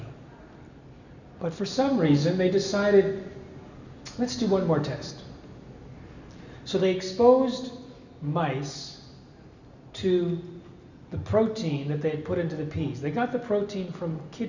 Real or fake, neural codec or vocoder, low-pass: real; none; 7.2 kHz